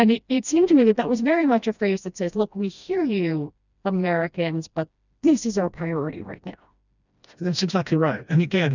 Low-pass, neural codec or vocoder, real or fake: 7.2 kHz; codec, 16 kHz, 1 kbps, FreqCodec, smaller model; fake